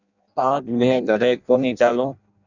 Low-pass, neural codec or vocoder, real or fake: 7.2 kHz; codec, 16 kHz in and 24 kHz out, 0.6 kbps, FireRedTTS-2 codec; fake